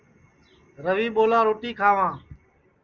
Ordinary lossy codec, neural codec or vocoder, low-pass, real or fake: Opus, 32 kbps; none; 7.2 kHz; real